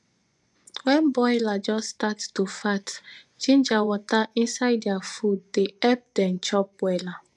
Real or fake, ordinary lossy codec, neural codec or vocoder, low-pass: fake; none; vocoder, 24 kHz, 100 mel bands, Vocos; none